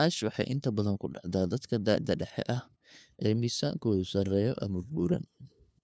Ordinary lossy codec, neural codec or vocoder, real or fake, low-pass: none; codec, 16 kHz, 2 kbps, FunCodec, trained on LibriTTS, 25 frames a second; fake; none